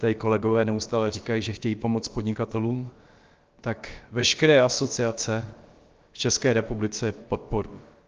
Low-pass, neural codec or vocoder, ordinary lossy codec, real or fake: 7.2 kHz; codec, 16 kHz, about 1 kbps, DyCAST, with the encoder's durations; Opus, 24 kbps; fake